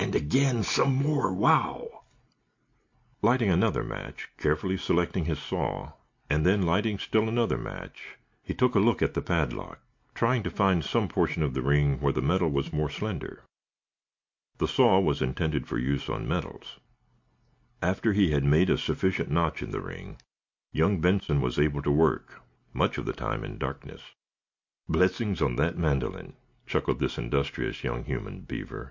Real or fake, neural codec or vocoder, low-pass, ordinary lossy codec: real; none; 7.2 kHz; MP3, 48 kbps